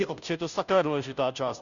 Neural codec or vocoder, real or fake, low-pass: codec, 16 kHz, 0.5 kbps, FunCodec, trained on Chinese and English, 25 frames a second; fake; 7.2 kHz